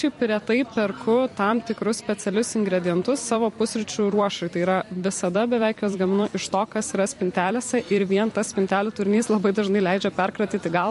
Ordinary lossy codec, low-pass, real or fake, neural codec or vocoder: MP3, 48 kbps; 14.4 kHz; fake; vocoder, 44.1 kHz, 128 mel bands every 256 samples, BigVGAN v2